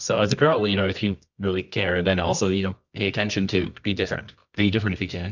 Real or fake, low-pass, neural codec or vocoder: fake; 7.2 kHz; codec, 24 kHz, 0.9 kbps, WavTokenizer, medium music audio release